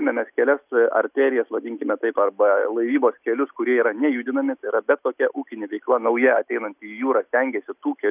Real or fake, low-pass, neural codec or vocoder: real; 3.6 kHz; none